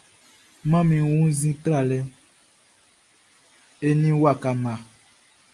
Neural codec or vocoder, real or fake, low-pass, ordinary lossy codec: none; real; 10.8 kHz; Opus, 32 kbps